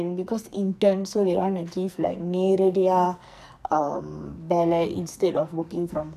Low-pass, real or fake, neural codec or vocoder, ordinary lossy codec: 14.4 kHz; fake; codec, 44.1 kHz, 2.6 kbps, SNAC; none